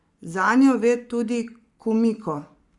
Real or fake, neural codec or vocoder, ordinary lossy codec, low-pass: fake; codec, 44.1 kHz, 7.8 kbps, Pupu-Codec; Opus, 64 kbps; 10.8 kHz